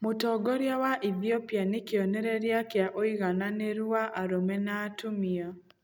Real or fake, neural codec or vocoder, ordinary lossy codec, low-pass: real; none; none; none